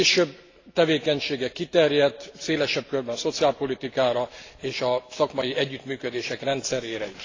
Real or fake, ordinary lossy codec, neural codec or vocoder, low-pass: real; AAC, 32 kbps; none; 7.2 kHz